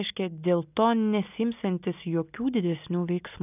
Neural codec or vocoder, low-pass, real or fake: none; 3.6 kHz; real